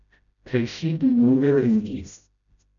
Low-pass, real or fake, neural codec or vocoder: 7.2 kHz; fake; codec, 16 kHz, 0.5 kbps, FreqCodec, smaller model